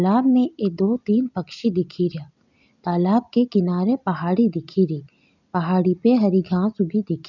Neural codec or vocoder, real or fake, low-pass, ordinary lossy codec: none; real; 7.2 kHz; none